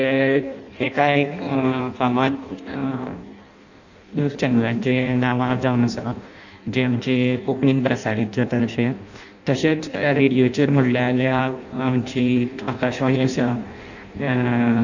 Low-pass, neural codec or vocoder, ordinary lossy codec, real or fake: 7.2 kHz; codec, 16 kHz in and 24 kHz out, 0.6 kbps, FireRedTTS-2 codec; none; fake